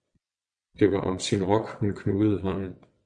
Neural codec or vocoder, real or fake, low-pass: vocoder, 22.05 kHz, 80 mel bands, WaveNeXt; fake; 9.9 kHz